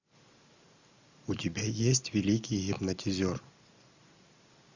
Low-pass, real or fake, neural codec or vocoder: 7.2 kHz; real; none